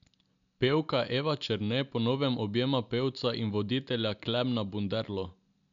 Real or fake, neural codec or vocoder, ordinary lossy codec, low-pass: real; none; none; 7.2 kHz